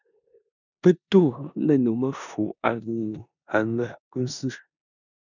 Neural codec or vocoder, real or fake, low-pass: codec, 16 kHz in and 24 kHz out, 0.9 kbps, LongCat-Audio-Codec, four codebook decoder; fake; 7.2 kHz